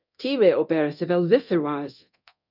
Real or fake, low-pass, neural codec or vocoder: fake; 5.4 kHz; codec, 24 kHz, 0.9 kbps, DualCodec